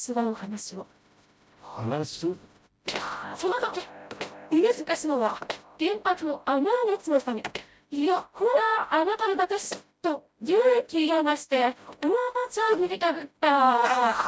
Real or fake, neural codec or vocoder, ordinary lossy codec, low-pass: fake; codec, 16 kHz, 0.5 kbps, FreqCodec, smaller model; none; none